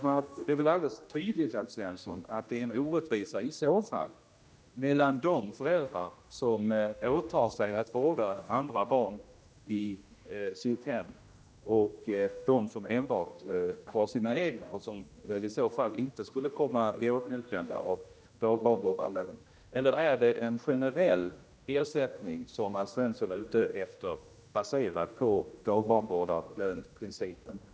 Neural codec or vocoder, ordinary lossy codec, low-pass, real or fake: codec, 16 kHz, 1 kbps, X-Codec, HuBERT features, trained on general audio; none; none; fake